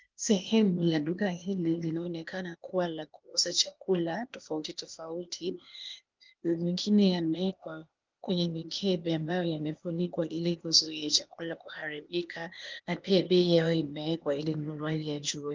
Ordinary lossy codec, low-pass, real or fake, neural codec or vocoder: Opus, 32 kbps; 7.2 kHz; fake; codec, 16 kHz, 0.8 kbps, ZipCodec